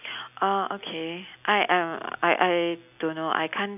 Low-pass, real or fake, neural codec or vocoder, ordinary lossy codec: 3.6 kHz; real; none; none